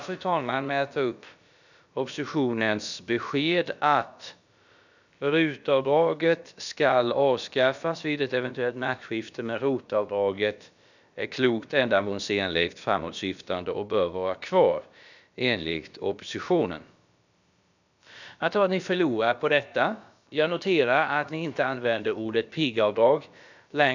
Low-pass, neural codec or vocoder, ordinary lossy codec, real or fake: 7.2 kHz; codec, 16 kHz, about 1 kbps, DyCAST, with the encoder's durations; none; fake